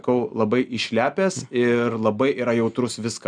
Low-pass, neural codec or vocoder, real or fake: 9.9 kHz; none; real